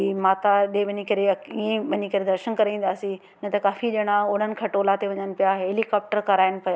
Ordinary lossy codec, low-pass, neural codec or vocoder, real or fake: none; none; none; real